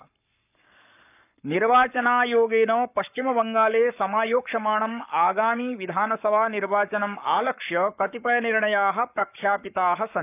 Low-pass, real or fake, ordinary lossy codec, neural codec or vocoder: 3.6 kHz; fake; none; codec, 44.1 kHz, 7.8 kbps, Pupu-Codec